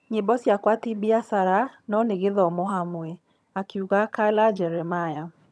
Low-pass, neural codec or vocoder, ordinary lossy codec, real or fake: none; vocoder, 22.05 kHz, 80 mel bands, HiFi-GAN; none; fake